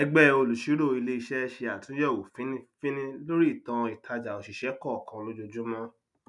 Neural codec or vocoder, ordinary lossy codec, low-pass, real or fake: none; none; 10.8 kHz; real